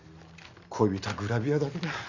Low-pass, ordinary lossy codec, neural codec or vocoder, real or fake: 7.2 kHz; none; none; real